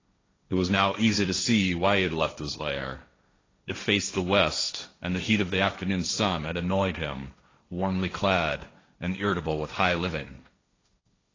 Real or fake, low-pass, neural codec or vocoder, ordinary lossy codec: fake; 7.2 kHz; codec, 16 kHz, 1.1 kbps, Voila-Tokenizer; AAC, 32 kbps